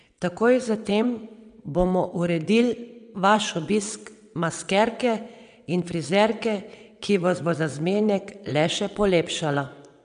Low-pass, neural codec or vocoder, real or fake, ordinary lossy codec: 9.9 kHz; vocoder, 22.05 kHz, 80 mel bands, WaveNeXt; fake; none